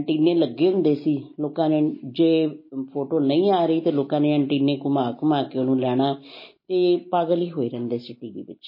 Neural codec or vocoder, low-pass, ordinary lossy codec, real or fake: none; 5.4 kHz; MP3, 24 kbps; real